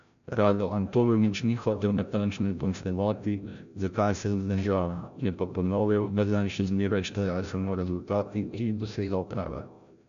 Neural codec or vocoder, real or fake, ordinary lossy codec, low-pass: codec, 16 kHz, 0.5 kbps, FreqCodec, larger model; fake; none; 7.2 kHz